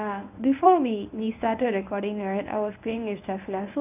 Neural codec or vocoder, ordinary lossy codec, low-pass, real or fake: codec, 24 kHz, 0.9 kbps, WavTokenizer, medium speech release version 1; none; 3.6 kHz; fake